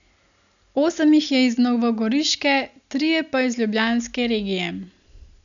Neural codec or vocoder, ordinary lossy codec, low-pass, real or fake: none; none; 7.2 kHz; real